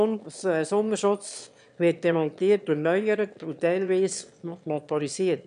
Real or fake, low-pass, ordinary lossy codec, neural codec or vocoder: fake; 9.9 kHz; AAC, 96 kbps; autoencoder, 22.05 kHz, a latent of 192 numbers a frame, VITS, trained on one speaker